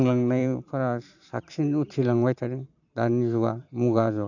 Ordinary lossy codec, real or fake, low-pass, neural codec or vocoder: none; fake; 7.2 kHz; vocoder, 44.1 kHz, 128 mel bands every 512 samples, BigVGAN v2